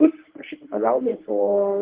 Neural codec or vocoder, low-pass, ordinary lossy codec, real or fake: codec, 24 kHz, 0.9 kbps, WavTokenizer, medium speech release version 1; 3.6 kHz; Opus, 16 kbps; fake